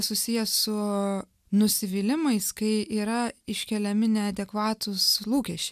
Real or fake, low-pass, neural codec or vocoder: real; 14.4 kHz; none